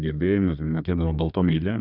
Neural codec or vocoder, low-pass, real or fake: codec, 32 kHz, 1.9 kbps, SNAC; 5.4 kHz; fake